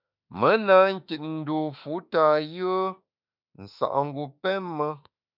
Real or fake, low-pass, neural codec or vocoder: fake; 5.4 kHz; autoencoder, 48 kHz, 32 numbers a frame, DAC-VAE, trained on Japanese speech